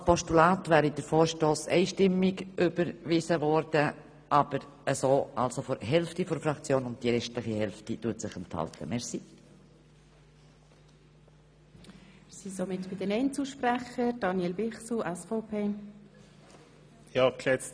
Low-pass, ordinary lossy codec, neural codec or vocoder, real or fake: none; none; none; real